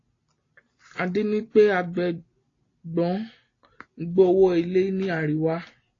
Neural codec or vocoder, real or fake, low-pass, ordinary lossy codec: none; real; 7.2 kHz; AAC, 32 kbps